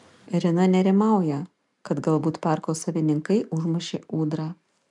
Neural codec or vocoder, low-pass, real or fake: none; 10.8 kHz; real